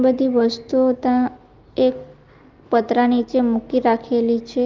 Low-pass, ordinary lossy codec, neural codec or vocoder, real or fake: 7.2 kHz; Opus, 32 kbps; none; real